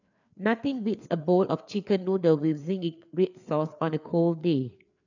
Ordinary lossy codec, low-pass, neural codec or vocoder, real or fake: none; 7.2 kHz; codec, 16 kHz, 4 kbps, FreqCodec, larger model; fake